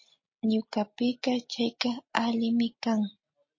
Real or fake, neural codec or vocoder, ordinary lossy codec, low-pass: real; none; MP3, 32 kbps; 7.2 kHz